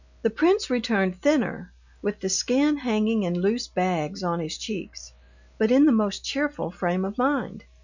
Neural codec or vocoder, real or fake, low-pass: none; real; 7.2 kHz